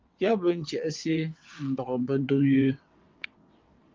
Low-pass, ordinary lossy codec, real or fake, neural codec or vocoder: 7.2 kHz; Opus, 32 kbps; fake; vocoder, 22.05 kHz, 80 mel bands, WaveNeXt